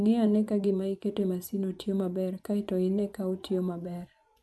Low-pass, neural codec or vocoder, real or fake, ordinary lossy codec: none; vocoder, 24 kHz, 100 mel bands, Vocos; fake; none